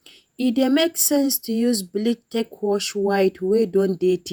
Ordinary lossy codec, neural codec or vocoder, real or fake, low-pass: none; vocoder, 48 kHz, 128 mel bands, Vocos; fake; none